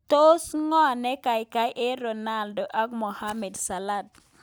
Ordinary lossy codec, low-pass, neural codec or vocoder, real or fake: none; none; none; real